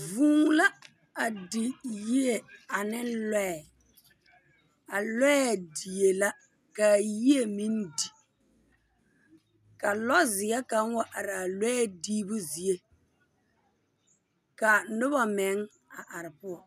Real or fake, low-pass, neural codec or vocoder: real; 14.4 kHz; none